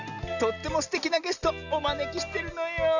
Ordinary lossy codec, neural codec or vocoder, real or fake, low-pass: none; none; real; 7.2 kHz